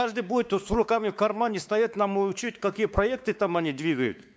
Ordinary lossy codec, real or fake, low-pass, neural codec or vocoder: none; fake; none; codec, 16 kHz, 4 kbps, X-Codec, WavLM features, trained on Multilingual LibriSpeech